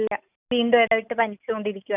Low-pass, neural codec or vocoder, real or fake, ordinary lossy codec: 3.6 kHz; none; real; none